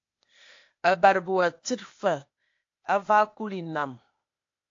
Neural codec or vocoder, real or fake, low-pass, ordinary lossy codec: codec, 16 kHz, 0.8 kbps, ZipCodec; fake; 7.2 kHz; AAC, 48 kbps